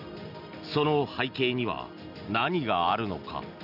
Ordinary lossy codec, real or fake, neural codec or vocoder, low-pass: none; real; none; 5.4 kHz